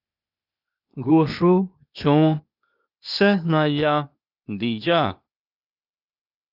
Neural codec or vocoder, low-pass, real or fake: codec, 16 kHz, 0.8 kbps, ZipCodec; 5.4 kHz; fake